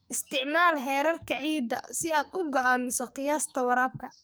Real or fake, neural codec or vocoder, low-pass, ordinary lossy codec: fake; codec, 44.1 kHz, 2.6 kbps, SNAC; none; none